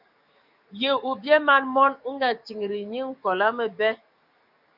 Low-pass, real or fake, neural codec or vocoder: 5.4 kHz; fake; codec, 44.1 kHz, 7.8 kbps, DAC